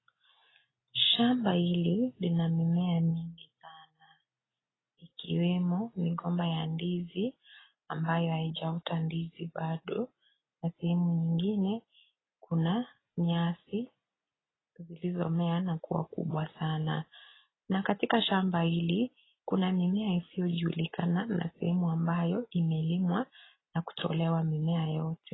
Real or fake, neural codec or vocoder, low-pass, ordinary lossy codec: real; none; 7.2 kHz; AAC, 16 kbps